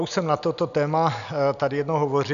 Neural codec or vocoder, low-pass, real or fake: none; 7.2 kHz; real